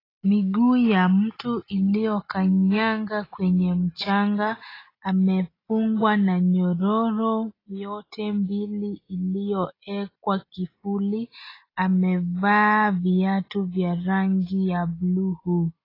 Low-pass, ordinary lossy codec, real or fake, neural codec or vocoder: 5.4 kHz; AAC, 24 kbps; real; none